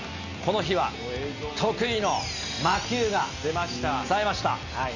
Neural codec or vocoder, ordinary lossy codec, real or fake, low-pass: none; none; real; 7.2 kHz